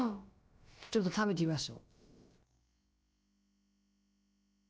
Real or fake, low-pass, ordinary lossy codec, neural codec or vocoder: fake; none; none; codec, 16 kHz, about 1 kbps, DyCAST, with the encoder's durations